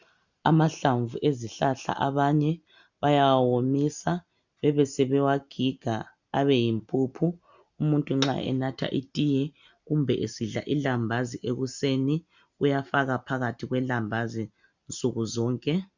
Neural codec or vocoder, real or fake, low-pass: none; real; 7.2 kHz